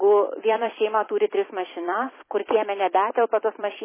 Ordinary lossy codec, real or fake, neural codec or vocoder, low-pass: MP3, 16 kbps; fake; vocoder, 44.1 kHz, 128 mel bands every 256 samples, BigVGAN v2; 3.6 kHz